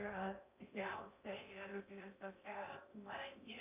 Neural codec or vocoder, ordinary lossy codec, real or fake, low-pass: codec, 16 kHz in and 24 kHz out, 0.6 kbps, FocalCodec, streaming, 4096 codes; MP3, 32 kbps; fake; 3.6 kHz